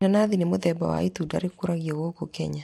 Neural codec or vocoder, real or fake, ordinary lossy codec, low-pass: vocoder, 44.1 kHz, 128 mel bands every 256 samples, BigVGAN v2; fake; MP3, 64 kbps; 19.8 kHz